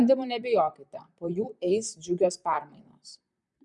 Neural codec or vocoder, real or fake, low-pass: vocoder, 44.1 kHz, 128 mel bands, Pupu-Vocoder; fake; 10.8 kHz